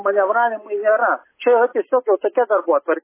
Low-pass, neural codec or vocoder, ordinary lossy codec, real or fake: 3.6 kHz; none; MP3, 16 kbps; real